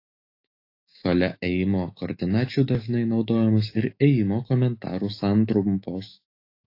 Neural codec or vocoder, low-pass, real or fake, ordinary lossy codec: autoencoder, 48 kHz, 128 numbers a frame, DAC-VAE, trained on Japanese speech; 5.4 kHz; fake; AAC, 32 kbps